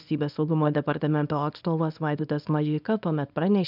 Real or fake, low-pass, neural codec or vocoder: fake; 5.4 kHz; codec, 24 kHz, 0.9 kbps, WavTokenizer, small release